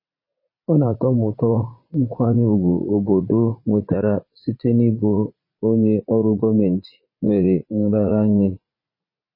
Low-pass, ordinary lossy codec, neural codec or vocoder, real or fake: 5.4 kHz; MP3, 24 kbps; vocoder, 44.1 kHz, 80 mel bands, Vocos; fake